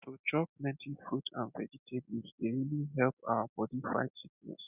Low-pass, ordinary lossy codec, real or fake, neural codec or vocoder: 3.6 kHz; none; real; none